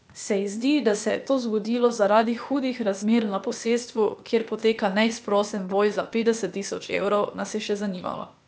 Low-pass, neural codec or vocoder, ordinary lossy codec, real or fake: none; codec, 16 kHz, 0.8 kbps, ZipCodec; none; fake